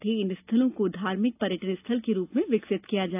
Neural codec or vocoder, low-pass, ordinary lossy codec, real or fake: none; 3.6 kHz; AAC, 32 kbps; real